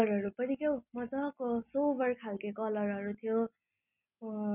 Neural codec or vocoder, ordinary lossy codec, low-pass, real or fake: none; none; 3.6 kHz; real